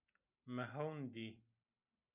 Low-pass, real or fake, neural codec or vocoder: 3.6 kHz; real; none